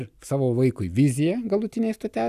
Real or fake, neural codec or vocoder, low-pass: real; none; 14.4 kHz